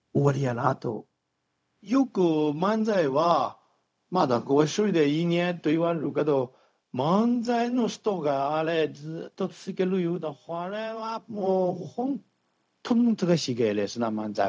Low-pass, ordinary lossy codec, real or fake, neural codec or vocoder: none; none; fake; codec, 16 kHz, 0.4 kbps, LongCat-Audio-Codec